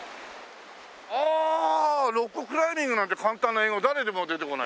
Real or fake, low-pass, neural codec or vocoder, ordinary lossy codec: real; none; none; none